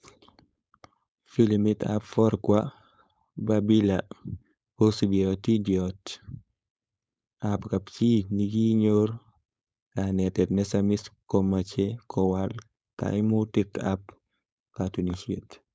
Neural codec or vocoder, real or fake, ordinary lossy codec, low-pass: codec, 16 kHz, 4.8 kbps, FACodec; fake; none; none